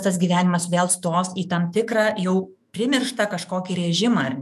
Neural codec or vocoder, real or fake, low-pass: autoencoder, 48 kHz, 128 numbers a frame, DAC-VAE, trained on Japanese speech; fake; 14.4 kHz